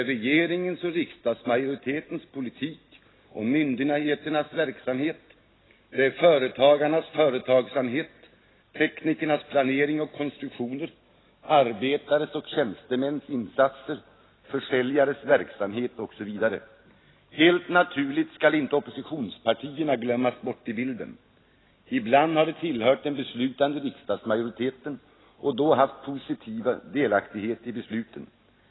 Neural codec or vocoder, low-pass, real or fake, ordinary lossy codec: none; 7.2 kHz; real; AAC, 16 kbps